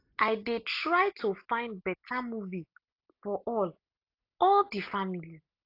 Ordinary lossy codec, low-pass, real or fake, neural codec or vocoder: AAC, 32 kbps; 5.4 kHz; real; none